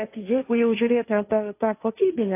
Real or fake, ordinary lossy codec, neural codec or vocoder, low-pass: fake; AAC, 24 kbps; codec, 16 kHz, 1.1 kbps, Voila-Tokenizer; 3.6 kHz